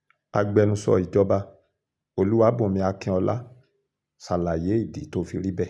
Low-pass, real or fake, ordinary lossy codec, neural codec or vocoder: none; real; none; none